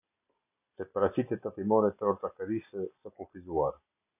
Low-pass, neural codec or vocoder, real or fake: 3.6 kHz; none; real